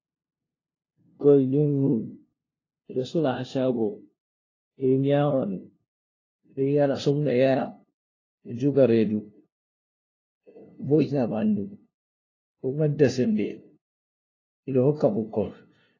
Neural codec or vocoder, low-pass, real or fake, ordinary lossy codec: codec, 16 kHz, 0.5 kbps, FunCodec, trained on LibriTTS, 25 frames a second; 7.2 kHz; fake; AAC, 32 kbps